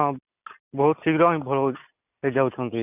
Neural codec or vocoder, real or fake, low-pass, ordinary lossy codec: none; real; 3.6 kHz; none